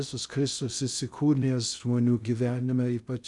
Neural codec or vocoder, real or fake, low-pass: codec, 16 kHz in and 24 kHz out, 0.6 kbps, FocalCodec, streaming, 2048 codes; fake; 10.8 kHz